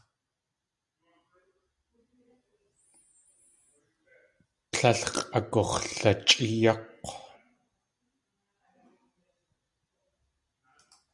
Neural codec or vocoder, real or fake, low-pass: none; real; 10.8 kHz